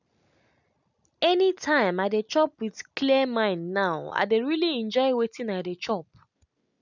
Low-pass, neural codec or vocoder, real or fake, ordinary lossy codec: 7.2 kHz; none; real; none